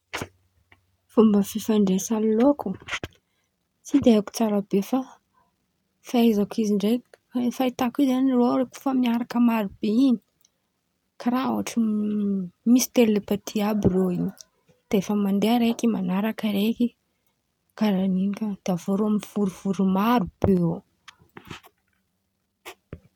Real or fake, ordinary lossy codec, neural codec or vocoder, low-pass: real; none; none; 19.8 kHz